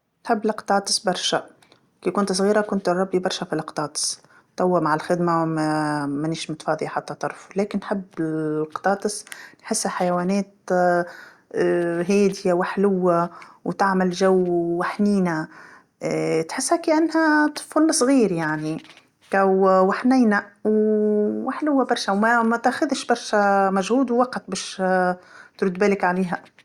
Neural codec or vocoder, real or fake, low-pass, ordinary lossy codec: none; real; 19.8 kHz; Opus, 64 kbps